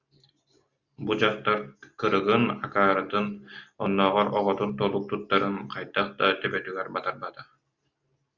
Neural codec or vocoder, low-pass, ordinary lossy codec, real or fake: none; 7.2 kHz; Opus, 64 kbps; real